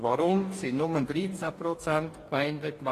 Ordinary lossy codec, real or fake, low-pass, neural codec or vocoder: AAC, 48 kbps; fake; 14.4 kHz; codec, 44.1 kHz, 2.6 kbps, DAC